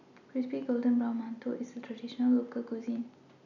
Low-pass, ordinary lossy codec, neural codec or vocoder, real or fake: 7.2 kHz; none; none; real